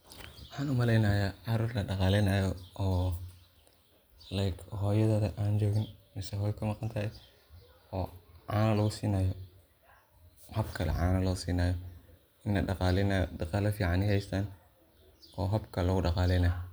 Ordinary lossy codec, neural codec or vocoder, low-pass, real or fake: none; none; none; real